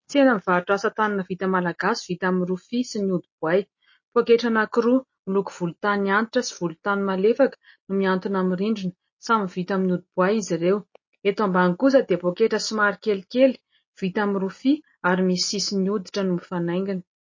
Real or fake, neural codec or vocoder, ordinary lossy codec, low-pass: real; none; MP3, 32 kbps; 7.2 kHz